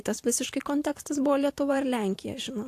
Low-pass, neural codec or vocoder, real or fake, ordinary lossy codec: 14.4 kHz; vocoder, 44.1 kHz, 128 mel bands every 512 samples, BigVGAN v2; fake; AAC, 64 kbps